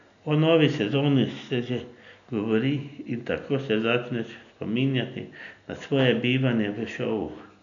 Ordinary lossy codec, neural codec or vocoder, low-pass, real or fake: none; none; 7.2 kHz; real